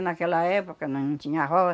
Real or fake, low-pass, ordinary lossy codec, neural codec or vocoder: real; none; none; none